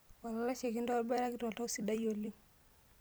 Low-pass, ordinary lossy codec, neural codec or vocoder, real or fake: none; none; vocoder, 44.1 kHz, 128 mel bands every 256 samples, BigVGAN v2; fake